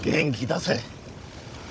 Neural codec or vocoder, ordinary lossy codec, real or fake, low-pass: codec, 16 kHz, 16 kbps, FunCodec, trained on Chinese and English, 50 frames a second; none; fake; none